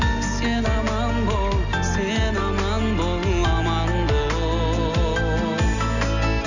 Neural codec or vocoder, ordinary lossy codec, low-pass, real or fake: none; none; 7.2 kHz; real